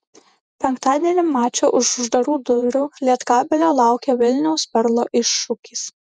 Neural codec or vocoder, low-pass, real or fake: vocoder, 48 kHz, 128 mel bands, Vocos; 10.8 kHz; fake